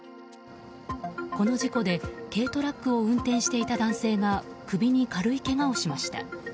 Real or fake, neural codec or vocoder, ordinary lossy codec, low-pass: real; none; none; none